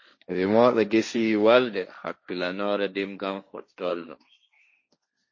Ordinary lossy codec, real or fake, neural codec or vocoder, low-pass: MP3, 32 kbps; fake; codec, 16 kHz, 1.1 kbps, Voila-Tokenizer; 7.2 kHz